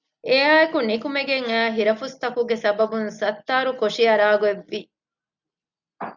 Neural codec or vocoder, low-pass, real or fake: none; 7.2 kHz; real